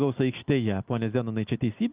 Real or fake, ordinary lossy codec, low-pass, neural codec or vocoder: real; Opus, 24 kbps; 3.6 kHz; none